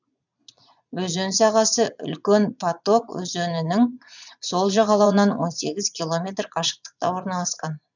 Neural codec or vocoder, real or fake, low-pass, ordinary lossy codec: vocoder, 22.05 kHz, 80 mel bands, Vocos; fake; 7.2 kHz; none